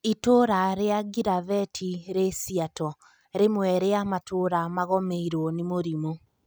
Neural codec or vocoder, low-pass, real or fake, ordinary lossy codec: none; none; real; none